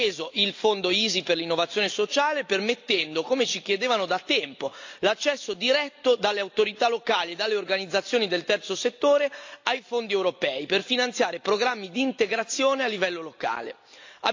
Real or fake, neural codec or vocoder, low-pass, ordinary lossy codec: real; none; 7.2 kHz; AAC, 48 kbps